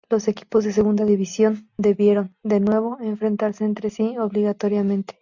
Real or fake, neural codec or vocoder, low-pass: real; none; 7.2 kHz